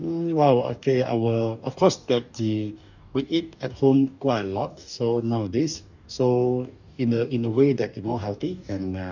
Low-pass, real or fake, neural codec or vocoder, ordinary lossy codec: 7.2 kHz; fake; codec, 44.1 kHz, 2.6 kbps, DAC; none